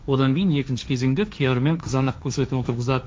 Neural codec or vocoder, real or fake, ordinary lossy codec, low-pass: codec, 16 kHz, 1.1 kbps, Voila-Tokenizer; fake; none; none